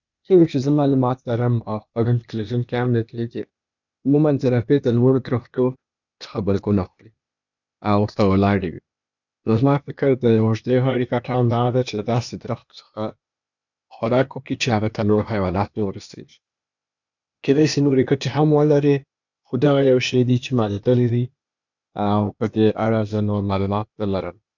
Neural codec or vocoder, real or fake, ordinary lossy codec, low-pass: codec, 16 kHz, 0.8 kbps, ZipCodec; fake; none; 7.2 kHz